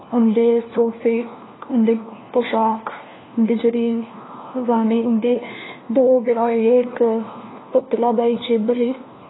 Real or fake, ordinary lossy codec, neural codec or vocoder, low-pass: fake; AAC, 16 kbps; codec, 16 kHz, 1 kbps, FunCodec, trained on LibriTTS, 50 frames a second; 7.2 kHz